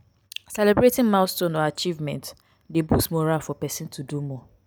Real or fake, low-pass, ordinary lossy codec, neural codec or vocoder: real; none; none; none